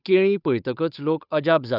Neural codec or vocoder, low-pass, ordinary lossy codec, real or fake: codec, 16 kHz, 8 kbps, FunCodec, trained on Chinese and English, 25 frames a second; 5.4 kHz; none; fake